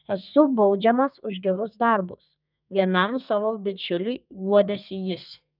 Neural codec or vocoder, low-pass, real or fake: codec, 32 kHz, 1.9 kbps, SNAC; 5.4 kHz; fake